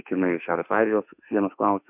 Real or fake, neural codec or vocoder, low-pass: fake; autoencoder, 48 kHz, 32 numbers a frame, DAC-VAE, trained on Japanese speech; 3.6 kHz